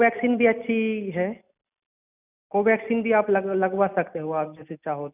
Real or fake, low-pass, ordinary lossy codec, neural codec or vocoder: real; 3.6 kHz; none; none